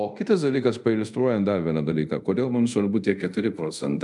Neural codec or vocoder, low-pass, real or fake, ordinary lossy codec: codec, 24 kHz, 0.5 kbps, DualCodec; 10.8 kHz; fake; MP3, 96 kbps